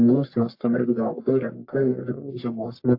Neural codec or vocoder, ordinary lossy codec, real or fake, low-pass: codec, 44.1 kHz, 1.7 kbps, Pupu-Codec; AAC, 32 kbps; fake; 5.4 kHz